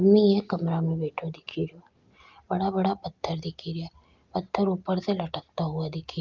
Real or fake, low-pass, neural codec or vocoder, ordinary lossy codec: real; 7.2 kHz; none; Opus, 32 kbps